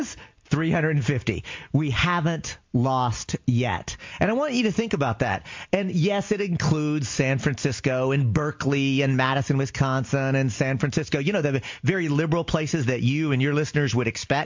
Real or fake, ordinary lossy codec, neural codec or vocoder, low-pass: real; MP3, 48 kbps; none; 7.2 kHz